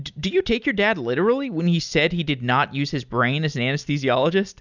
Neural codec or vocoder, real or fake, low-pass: none; real; 7.2 kHz